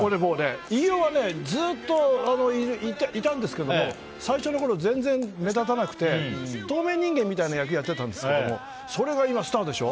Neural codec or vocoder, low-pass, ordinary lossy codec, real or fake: none; none; none; real